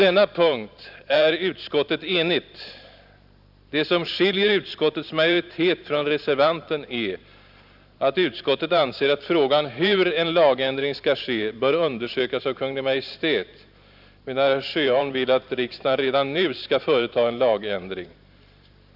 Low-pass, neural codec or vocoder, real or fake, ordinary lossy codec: 5.4 kHz; vocoder, 44.1 kHz, 128 mel bands every 512 samples, BigVGAN v2; fake; none